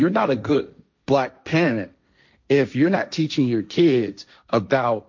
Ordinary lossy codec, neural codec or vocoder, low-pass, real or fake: MP3, 48 kbps; codec, 16 kHz, 1.1 kbps, Voila-Tokenizer; 7.2 kHz; fake